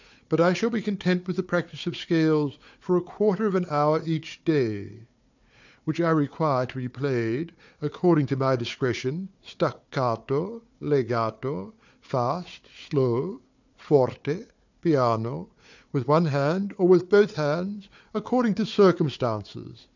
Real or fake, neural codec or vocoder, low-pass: fake; codec, 16 kHz, 4 kbps, FunCodec, trained on Chinese and English, 50 frames a second; 7.2 kHz